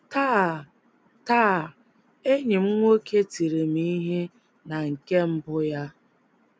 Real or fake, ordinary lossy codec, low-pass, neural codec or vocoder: real; none; none; none